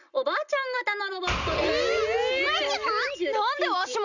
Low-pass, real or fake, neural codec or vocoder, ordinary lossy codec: 7.2 kHz; real; none; none